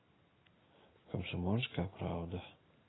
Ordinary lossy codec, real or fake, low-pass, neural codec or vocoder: AAC, 16 kbps; real; 7.2 kHz; none